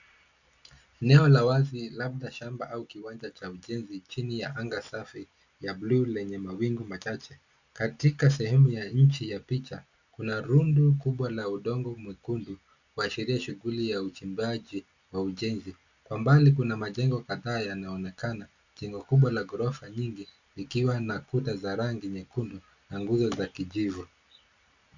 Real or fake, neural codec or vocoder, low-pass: real; none; 7.2 kHz